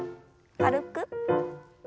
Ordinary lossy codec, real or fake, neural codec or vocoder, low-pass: none; real; none; none